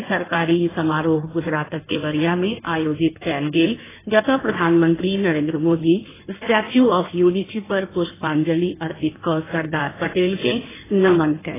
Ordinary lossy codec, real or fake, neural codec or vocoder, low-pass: AAC, 16 kbps; fake; codec, 16 kHz in and 24 kHz out, 1.1 kbps, FireRedTTS-2 codec; 3.6 kHz